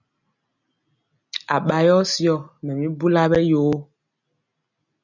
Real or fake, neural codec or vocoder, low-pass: real; none; 7.2 kHz